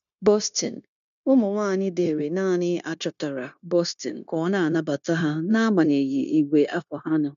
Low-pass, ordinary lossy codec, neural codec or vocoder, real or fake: 7.2 kHz; none; codec, 16 kHz, 0.9 kbps, LongCat-Audio-Codec; fake